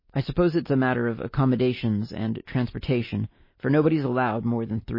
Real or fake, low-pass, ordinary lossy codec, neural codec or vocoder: real; 5.4 kHz; MP3, 24 kbps; none